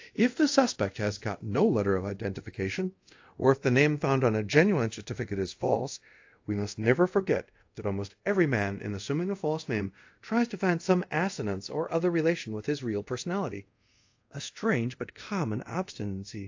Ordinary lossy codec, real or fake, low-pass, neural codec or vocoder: AAC, 48 kbps; fake; 7.2 kHz; codec, 24 kHz, 0.5 kbps, DualCodec